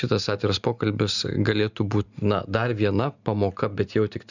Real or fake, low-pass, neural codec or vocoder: real; 7.2 kHz; none